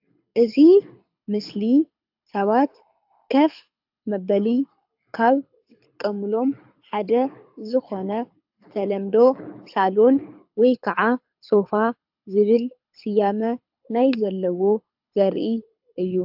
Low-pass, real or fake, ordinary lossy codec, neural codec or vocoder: 5.4 kHz; fake; AAC, 48 kbps; codec, 24 kHz, 6 kbps, HILCodec